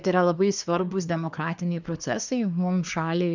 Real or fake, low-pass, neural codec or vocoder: fake; 7.2 kHz; codec, 24 kHz, 1 kbps, SNAC